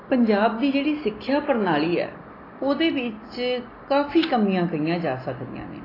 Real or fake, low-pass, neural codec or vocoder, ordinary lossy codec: real; 5.4 kHz; none; AAC, 24 kbps